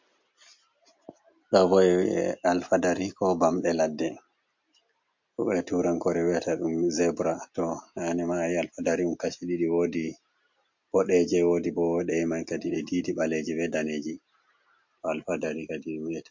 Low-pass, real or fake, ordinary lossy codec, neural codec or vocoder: 7.2 kHz; real; MP3, 48 kbps; none